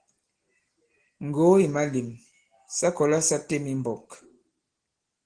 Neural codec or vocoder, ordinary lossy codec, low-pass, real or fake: none; Opus, 16 kbps; 9.9 kHz; real